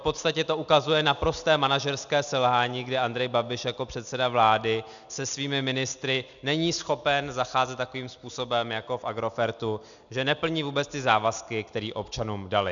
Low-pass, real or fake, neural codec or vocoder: 7.2 kHz; real; none